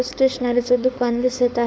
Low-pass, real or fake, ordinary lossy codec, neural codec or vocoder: none; fake; none; codec, 16 kHz, 4.8 kbps, FACodec